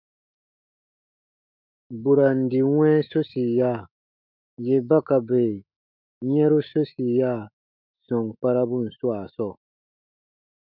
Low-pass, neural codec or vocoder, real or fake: 5.4 kHz; autoencoder, 48 kHz, 128 numbers a frame, DAC-VAE, trained on Japanese speech; fake